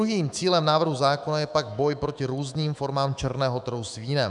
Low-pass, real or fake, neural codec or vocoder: 10.8 kHz; fake; autoencoder, 48 kHz, 128 numbers a frame, DAC-VAE, trained on Japanese speech